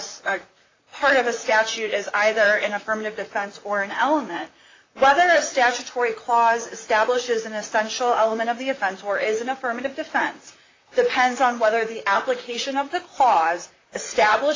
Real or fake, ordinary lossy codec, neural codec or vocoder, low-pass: fake; AAC, 32 kbps; vocoder, 44.1 kHz, 128 mel bands, Pupu-Vocoder; 7.2 kHz